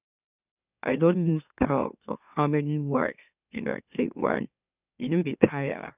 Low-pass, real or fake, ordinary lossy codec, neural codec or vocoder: 3.6 kHz; fake; none; autoencoder, 44.1 kHz, a latent of 192 numbers a frame, MeloTTS